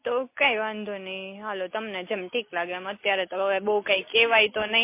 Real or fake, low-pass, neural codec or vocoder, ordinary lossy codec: real; 3.6 kHz; none; MP3, 24 kbps